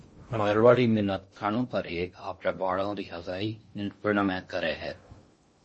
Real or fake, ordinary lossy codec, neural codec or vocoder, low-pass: fake; MP3, 32 kbps; codec, 16 kHz in and 24 kHz out, 0.6 kbps, FocalCodec, streaming, 2048 codes; 10.8 kHz